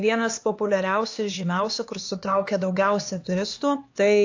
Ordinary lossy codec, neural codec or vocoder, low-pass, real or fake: AAC, 48 kbps; codec, 16 kHz, 2 kbps, X-Codec, HuBERT features, trained on LibriSpeech; 7.2 kHz; fake